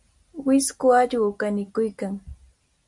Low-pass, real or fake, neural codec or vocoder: 10.8 kHz; real; none